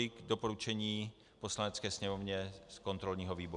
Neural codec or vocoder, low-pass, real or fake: none; 10.8 kHz; real